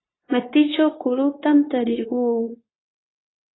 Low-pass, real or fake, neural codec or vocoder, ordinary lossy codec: 7.2 kHz; fake; codec, 16 kHz, 0.9 kbps, LongCat-Audio-Codec; AAC, 16 kbps